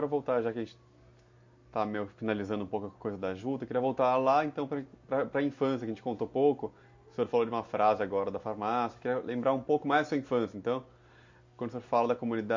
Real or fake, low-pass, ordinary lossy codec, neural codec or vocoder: real; 7.2 kHz; MP3, 48 kbps; none